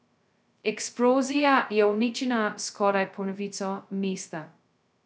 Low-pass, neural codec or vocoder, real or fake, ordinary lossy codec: none; codec, 16 kHz, 0.2 kbps, FocalCodec; fake; none